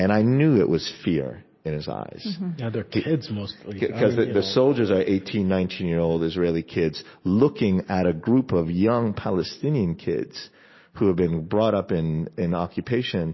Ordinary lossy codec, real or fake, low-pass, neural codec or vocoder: MP3, 24 kbps; real; 7.2 kHz; none